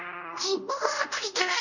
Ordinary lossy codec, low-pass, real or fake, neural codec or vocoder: none; 7.2 kHz; fake; codec, 24 kHz, 0.5 kbps, DualCodec